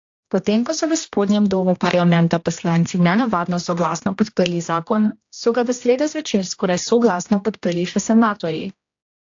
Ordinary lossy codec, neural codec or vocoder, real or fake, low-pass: AAC, 48 kbps; codec, 16 kHz, 1 kbps, X-Codec, HuBERT features, trained on general audio; fake; 7.2 kHz